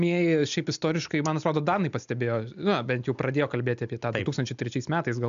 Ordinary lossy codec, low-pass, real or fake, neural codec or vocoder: AAC, 96 kbps; 7.2 kHz; real; none